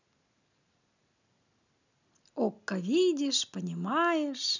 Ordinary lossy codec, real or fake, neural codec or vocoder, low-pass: none; real; none; 7.2 kHz